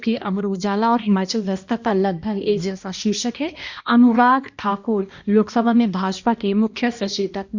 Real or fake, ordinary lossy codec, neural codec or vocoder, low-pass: fake; Opus, 64 kbps; codec, 16 kHz, 1 kbps, X-Codec, HuBERT features, trained on balanced general audio; 7.2 kHz